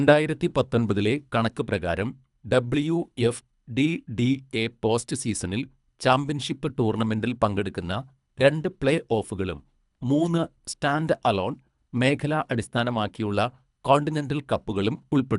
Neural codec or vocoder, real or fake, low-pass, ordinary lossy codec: codec, 24 kHz, 3 kbps, HILCodec; fake; 10.8 kHz; none